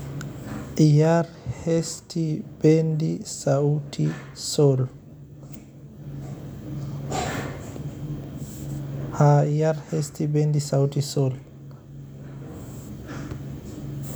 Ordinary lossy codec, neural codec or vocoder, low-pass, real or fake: none; none; none; real